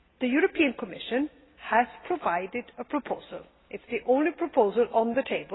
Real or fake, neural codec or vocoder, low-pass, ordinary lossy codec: real; none; 7.2 kHz; AAC, 16 kbps